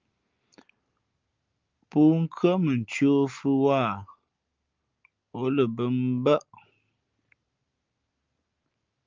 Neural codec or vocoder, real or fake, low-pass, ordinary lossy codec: none; real; 7.2 kHz; Opus, 24 kbps